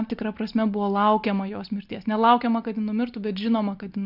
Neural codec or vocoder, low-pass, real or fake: none; 5.4 kHz; real